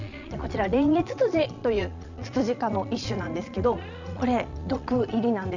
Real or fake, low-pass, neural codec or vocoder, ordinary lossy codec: fake; 7.2 kHz; vocoder, 22.05 kHz, 80 mel bands, Vocos; none